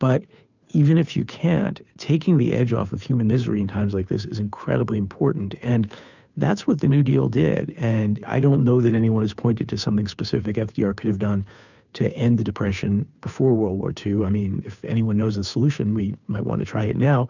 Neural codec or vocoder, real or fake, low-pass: codec, 16 kHz, 2 kbps, FunCodec, trained on Chinese and English, 25 frames a second; fake; 7.2 kHz